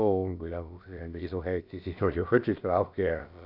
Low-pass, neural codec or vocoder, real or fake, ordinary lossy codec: 5.4 kHz; codec, 16 kHz, about 1 kbps, DyCAST, with the encoder's durations; fake; none